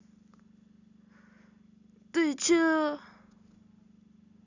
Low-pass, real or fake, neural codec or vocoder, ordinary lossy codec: 7.2 kHz; real; none; none